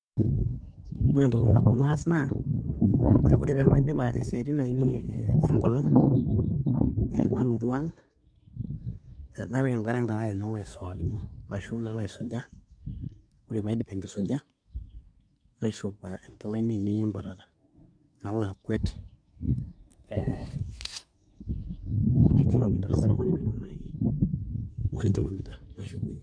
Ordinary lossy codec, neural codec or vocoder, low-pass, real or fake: none; codec, 24 kHz, 1 kbps, SNAC; 9.9 kHz; fake